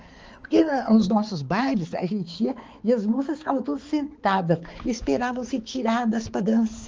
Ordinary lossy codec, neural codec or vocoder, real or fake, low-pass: Opus, 24 kbps; codec, 16 kHz, 4 kbps, X-Codec, HuBERT features, trained on balanced general audio; fake; 7.2 kHz